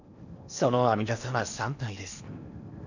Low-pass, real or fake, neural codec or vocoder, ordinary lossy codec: 7.2 kHz; fake; codec, 16 kHz in and 24 kHz out, 0.8 kbps, FocalCodec, streaming, 65536 codes; none